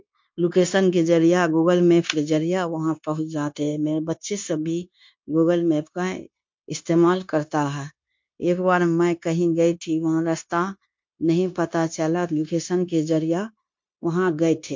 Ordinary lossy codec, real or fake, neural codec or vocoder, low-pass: MP3, 48 kbps; fake; codec, 16 kHz, 0.9 kbps, LongCat-Audio-Codec; 7.2 kHz